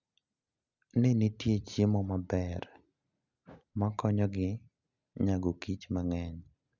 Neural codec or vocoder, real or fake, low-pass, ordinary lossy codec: none; real; 7.2 kHz; none